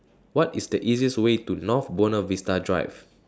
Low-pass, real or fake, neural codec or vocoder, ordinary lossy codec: none; real; none; none